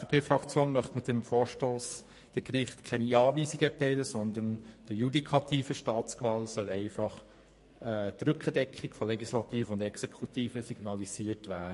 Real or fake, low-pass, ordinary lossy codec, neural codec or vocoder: fake; 14.4 kHz; MP3, 48 kbps; codec, 44.1 kHz, 2.6 kbps, SNAC